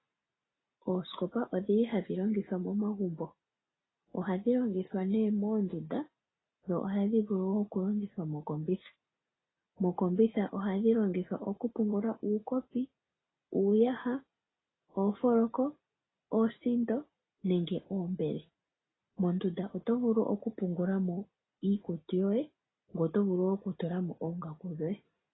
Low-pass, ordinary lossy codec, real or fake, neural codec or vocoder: 7.2 kHz; AAC, 16 kbps; real; none